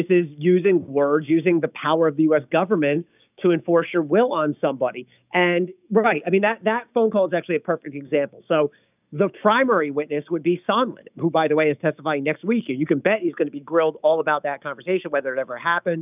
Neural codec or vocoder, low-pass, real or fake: codec, 16 kHz, 6 kbps, DAC; 3.6 kHz; fake